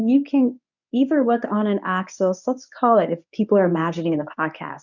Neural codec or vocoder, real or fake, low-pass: codec, 24 kHz, 0.9 kbps, WavTokenizer, medium speech release version 1; fake; 7.2 kHz